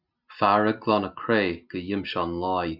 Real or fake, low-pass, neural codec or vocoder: real; 5.4 kHz; none